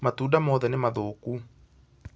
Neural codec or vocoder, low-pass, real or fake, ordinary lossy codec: none; none; real; none